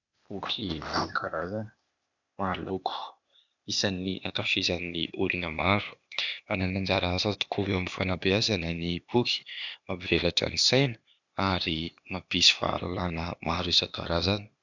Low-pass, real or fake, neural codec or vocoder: 7.2 kHz; fake; codec, 16 kHz, 0.8 kbps, ZipCodec